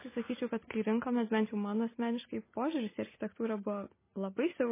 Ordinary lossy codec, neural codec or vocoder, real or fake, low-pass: MP3, 16 kbps; none; real; 3.6 kHz